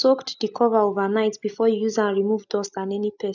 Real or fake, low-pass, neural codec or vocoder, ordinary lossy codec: real; 7.2 kHz; none; none